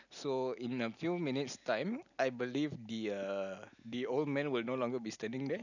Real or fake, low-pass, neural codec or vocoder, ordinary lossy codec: real; 7.2 kHz; none; none